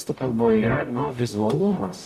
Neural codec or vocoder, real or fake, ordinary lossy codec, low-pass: codec, 44.1 kHz, 0.9 kbps, DAC; fake; MP3, 96 kbps; 14.4 kHz